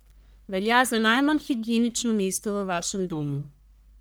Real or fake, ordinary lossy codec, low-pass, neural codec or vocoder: fake; none; none; codec, 44.1 kHz, 1.7 kbps, Pupu-Codec